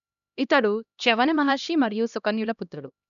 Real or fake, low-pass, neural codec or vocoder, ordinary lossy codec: fake; 7.2 kHz; codec, 16 kHz, 1 kbps, X-Codec, HuBERT features, trained on LibriSpeech; none